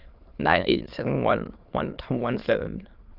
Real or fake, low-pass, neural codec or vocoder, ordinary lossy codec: fake; 5.4 kHz; autoencoder, 22.05 kHz, a latent of 192 numbers a frame, VITS, trained on many speakers; Opus, 32 kbps